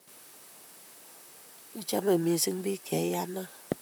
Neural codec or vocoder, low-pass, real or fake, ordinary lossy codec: vocoder, 44.1 kHz, 128 mel bands, Pupu-Vocoder; none; fake; none